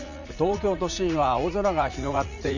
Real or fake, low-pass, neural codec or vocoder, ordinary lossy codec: fake; 7.2 kHz; vocoder, 44.1 kHz, 80 mel bands, Vocos; none